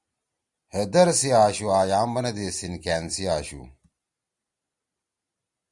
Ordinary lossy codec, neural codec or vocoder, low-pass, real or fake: Opus, 64 kbps; none; 10.8 kHz; real